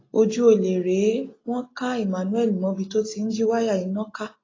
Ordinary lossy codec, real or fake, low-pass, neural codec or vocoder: AAC, 32 kbps; real; 7.2 kHz; none